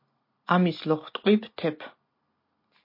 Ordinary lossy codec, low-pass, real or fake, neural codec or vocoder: MP3, 32 kbps; 5.4 kHz; real; none